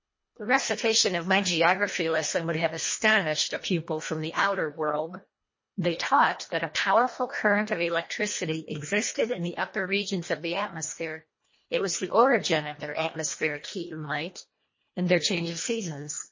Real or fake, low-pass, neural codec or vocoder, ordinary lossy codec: fake; 7.2 kHz; codec, 24 kHz, 1.5 kbps, HILCodec; MP3, 32 kbps